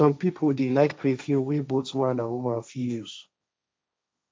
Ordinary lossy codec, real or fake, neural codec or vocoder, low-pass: none; fake; codec, 16 kHz, 1.1 kbps, Voila-Tokenizer; none